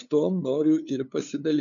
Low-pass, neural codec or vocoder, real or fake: 7.2 kHz; codec, 16 kHz, 2 kbps, FunCodec, trained on LibriTTS, 25 frames a second; fake